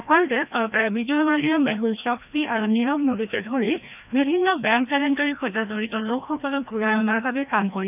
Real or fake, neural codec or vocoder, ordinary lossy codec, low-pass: fake; codec, 16 kHz, 1 kbps, FreqCodec, larger model; none; 3.6 kHz